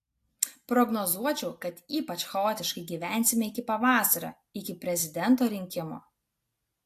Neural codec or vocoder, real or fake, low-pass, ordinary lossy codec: none; real; 14.4 kHz; AAC, 64 kbps